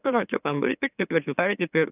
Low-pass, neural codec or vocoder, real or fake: 3.6 kHz; autoencoder, 44.1 kHz, a latent of 192 numbers a frame, MeloTTS; fake